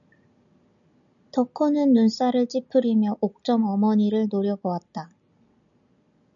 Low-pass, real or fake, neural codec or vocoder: 7.2 kHz; real; none